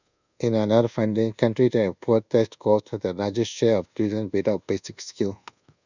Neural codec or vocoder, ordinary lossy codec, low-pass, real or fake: codec, 24 kHz, 1.2 kbps, DualCodec; none; 7.2 kHz; fake